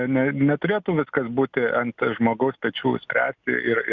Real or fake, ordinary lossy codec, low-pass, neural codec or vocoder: real; Opus, 64 kbps; 7.2 kHz; none